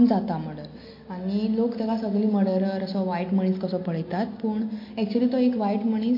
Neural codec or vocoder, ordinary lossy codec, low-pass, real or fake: none; MP3, 32 kbps; 5.4 kHz; real